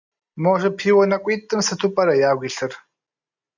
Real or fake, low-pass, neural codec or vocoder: real; 7.2 kHz; none